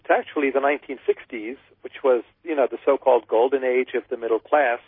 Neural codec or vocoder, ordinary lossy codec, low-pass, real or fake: none; MP3, 24 kbps; 5.4 kHz; real